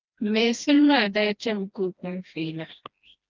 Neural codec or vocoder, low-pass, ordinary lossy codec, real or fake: codec, 16 kHz, 1 kbps, FreqCodec, smaller model; 7.2 kHz; Opus, 24 kbps; fake